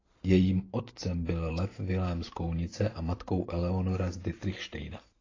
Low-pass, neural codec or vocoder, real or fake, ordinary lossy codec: 7.2 kHz; vocoder, 44.1 kHz, 128 mel bands every 512 samples, BigVGAN v2; fake; AAC, 32 kbps